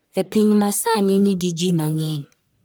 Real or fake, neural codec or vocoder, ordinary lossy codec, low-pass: fake; codec, 44.1 kHz, 1.7 kbps, Pupu-Codec; none; none